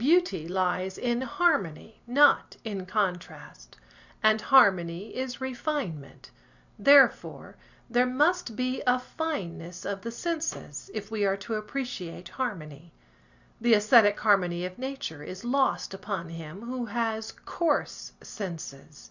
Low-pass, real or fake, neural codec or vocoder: 7.2 kHz; real; none